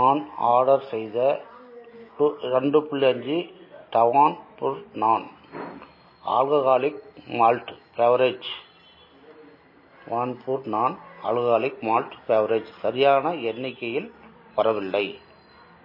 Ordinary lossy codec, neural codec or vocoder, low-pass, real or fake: MP3, 24 kbps; none; 5.4 kHz; real